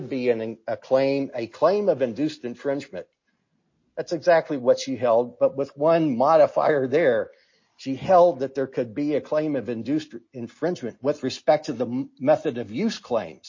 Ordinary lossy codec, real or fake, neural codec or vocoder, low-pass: MP3, 32 kbps; real; none; 7.2 kHz